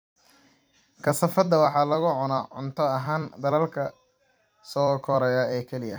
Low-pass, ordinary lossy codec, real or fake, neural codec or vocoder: none; none; fake; vocoder, 44.1 kHz, 128 mel bands every 256 samples, BigVGAN v2